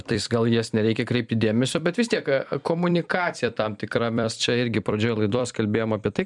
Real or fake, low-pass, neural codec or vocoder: fake; 10.8 kHz; vocoder, 44.1 kHz, 128 mel bands every 256 samples, BigVGAN v2